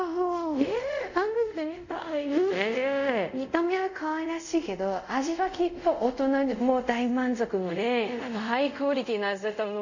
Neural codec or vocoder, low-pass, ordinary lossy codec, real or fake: codec, 24 kHz, 0.5 kbps, DualCodec; 7.2 kHz; none; fake